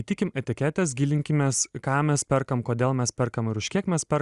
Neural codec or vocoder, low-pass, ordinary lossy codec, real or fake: none; 10.8 kHz; Opus, 64 kbps; real